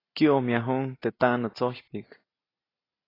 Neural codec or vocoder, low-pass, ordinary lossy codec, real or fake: none; 5.4 kHz; AAC, 24 kbps; real